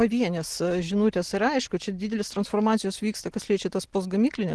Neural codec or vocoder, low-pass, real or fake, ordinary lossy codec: none; 10.8 kHz; real; Opus, 16 kbps